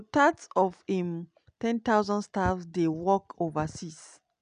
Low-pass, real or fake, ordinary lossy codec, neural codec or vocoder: 9.9 kHz; real; none; none